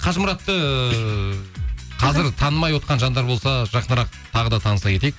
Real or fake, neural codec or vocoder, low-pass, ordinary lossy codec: real; none; none; none